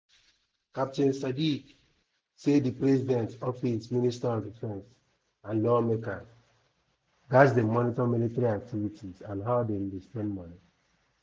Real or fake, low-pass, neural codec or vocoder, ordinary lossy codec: real; none; none; none